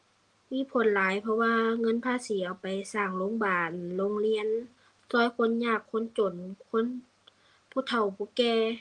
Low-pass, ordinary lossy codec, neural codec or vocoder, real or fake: 10.8 kHz; Opus, 24 kbps; none; real